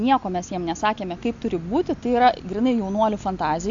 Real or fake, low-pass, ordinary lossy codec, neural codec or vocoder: real; 7.2 kHz; AAC, 64 kbps; none